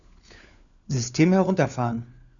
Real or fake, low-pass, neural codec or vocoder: fake; 7.2 kHz; codec, 16 kHz, 4 kbps, FunCodec, trained on LibriTTS, 50 frames a second